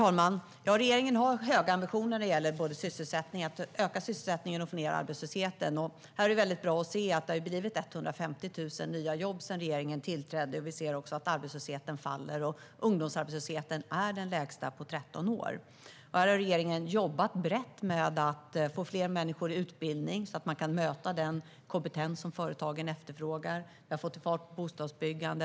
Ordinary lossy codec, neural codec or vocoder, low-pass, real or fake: none; none; none; real